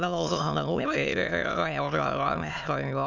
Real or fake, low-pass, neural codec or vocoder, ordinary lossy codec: fake; 7.2 kHz; autoencoder, 22.05 kHz, a latent of 192 numbers a frame, VITS, trained on many speakers; none